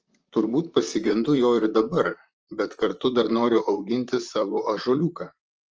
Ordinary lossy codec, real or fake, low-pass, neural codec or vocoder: Opus, 24 kbps; fake; 7.2 kHz; vocoder, 44.1 kHz, 128 mel bands, Pupu-Vocoder